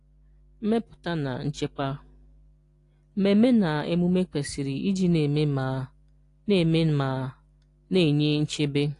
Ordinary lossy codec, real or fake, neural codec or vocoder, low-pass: AAC, 48 kbps; real; none; 10.8 kHz